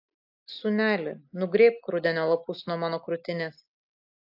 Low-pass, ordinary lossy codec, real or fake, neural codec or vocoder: 5.4 kHz; AAC, 48 kbps; real; none